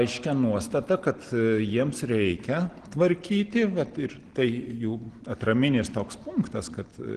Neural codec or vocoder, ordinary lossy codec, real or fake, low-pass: none; Opus, 16 kbps; real; 9.9 kHz